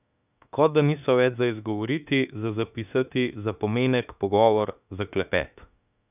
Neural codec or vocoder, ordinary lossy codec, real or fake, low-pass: autoencoder, 48 kHz, 32 numbers a frame, DAC-VAE, trained on Japanese speech; none; fake; 3.6 kHz